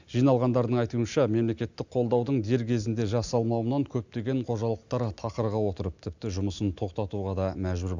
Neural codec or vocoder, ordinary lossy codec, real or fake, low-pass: none; none; real; 7.2 kHz